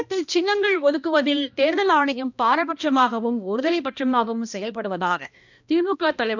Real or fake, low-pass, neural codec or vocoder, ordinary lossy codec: fake; 7.2 kHz; codec, 16 kHz, 1 kbps, X-Codec, HuBERT features, trained on balanced general audio; none